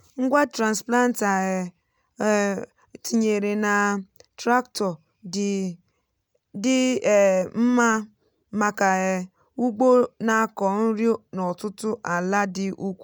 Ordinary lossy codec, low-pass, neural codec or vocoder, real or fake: none; none; none; real